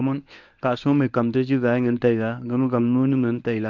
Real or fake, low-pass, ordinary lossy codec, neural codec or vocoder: fake; 7.2 kHz; none; codec, 24 kHz, 0.9 kbps, WavTokenizer, medium speech release version 1